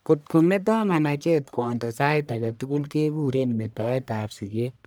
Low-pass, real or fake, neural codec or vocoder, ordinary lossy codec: none; fake; codec, 44.1 kHz, 1.7 kbps, Pupu-Codec; none